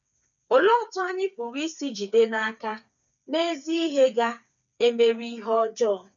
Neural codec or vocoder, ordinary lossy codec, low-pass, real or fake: codec, 16 kHz, 4 kbps, FreqCodec, smaller model; none; 7.2 kHz; fake